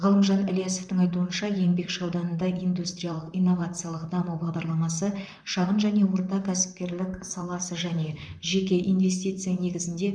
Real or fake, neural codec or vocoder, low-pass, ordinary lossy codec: fake; vocoder, 44.1 kHz, 128 mel bands, Pupu-Vocoder; 9.9 kHz; none